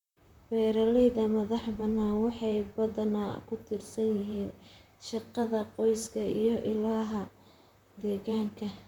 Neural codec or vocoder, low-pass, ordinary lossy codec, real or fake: vocoder, 44.1 kHz, 128 mel bands, Pupu-Vocoder; 19.8 kHz; none; fake